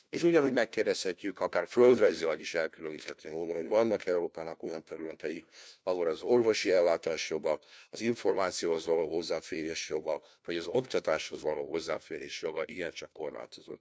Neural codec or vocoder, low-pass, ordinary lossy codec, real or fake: codec, 16 kHz, 1 kbps, FunCodec, trained on LibriTTS, 50 frames a second; none; none; fake